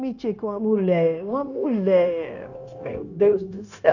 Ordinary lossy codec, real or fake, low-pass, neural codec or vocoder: none; fake; 7.2 kHz; codec, 16 kHz, 0.9 kbps, LongCat-Audio-Codec